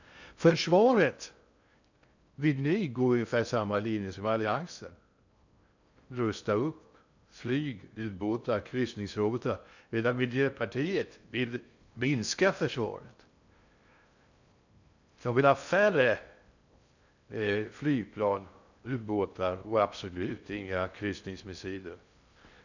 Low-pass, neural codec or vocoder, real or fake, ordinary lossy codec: 7.2 kHz; codec, 16 kHz in and 24 kHz out, 0.6 kbps, FocalCodec, streaming, 2048 codes; fake; none